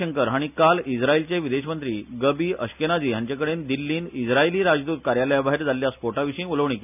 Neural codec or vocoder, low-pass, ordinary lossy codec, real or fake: none; 3.6 kHz; none; real